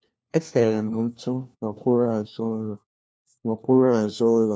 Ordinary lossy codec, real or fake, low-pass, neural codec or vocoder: none; fake; none; codec, 16 kHz, 1 kbps, FunCodec, trained on LibriTTS, 50 frames a second